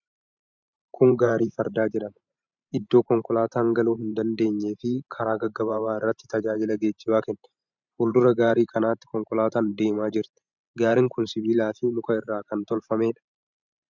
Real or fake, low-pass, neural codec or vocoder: fake; 7.2 kHz; vocoder, 44.1 kHz, 128 mel bands every 512 samples, BigVGAN v2